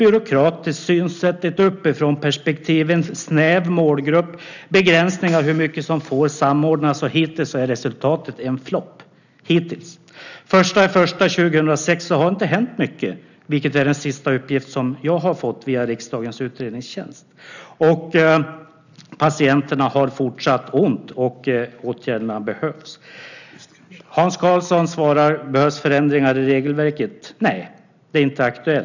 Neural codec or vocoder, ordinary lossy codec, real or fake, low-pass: none; none; real; 7.2 kHz